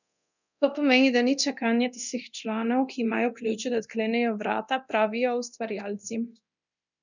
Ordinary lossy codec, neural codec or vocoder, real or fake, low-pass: none; codec, 24 kHz, 0.9 kbps, DualCodec; fake; 7.2 kHz